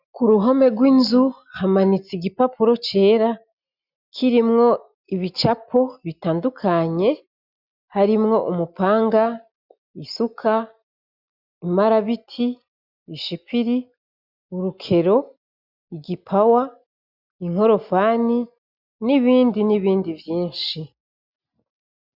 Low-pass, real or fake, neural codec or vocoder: 5.4 kHz; real; none